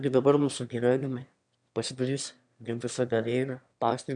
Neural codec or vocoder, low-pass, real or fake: autoencoder, 22.05 kHz, a latent of 192 numbers a frame, VITS, trained on one speaker; 9.9 kHz; fake